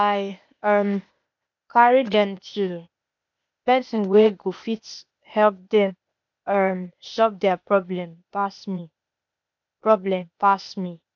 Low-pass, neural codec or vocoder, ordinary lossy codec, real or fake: 7.2 kHz; codec, 16 kHz, 0.8 kbps, ZipCodec; none; fake